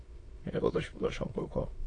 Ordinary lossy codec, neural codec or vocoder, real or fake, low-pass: AAC, 32 kbps; autoencoder, 22.05 kHz, a latent of 192 numbers a frame, VITS, trained on many speakers; fake; 9.9 kHz